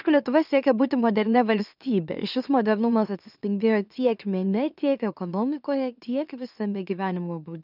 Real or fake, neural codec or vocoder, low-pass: fake; autoencoder, 44.1 kHz, a latent of 192 numbers a frame, MeloTTS; 5.4 kHz